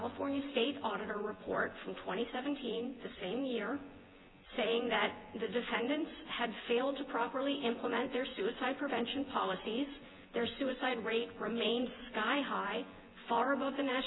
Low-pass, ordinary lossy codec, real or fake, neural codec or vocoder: 7.2 kHz; AAC, 16 kbps; fake; vocoder, 24 kHz, 100 mel bands, Vocos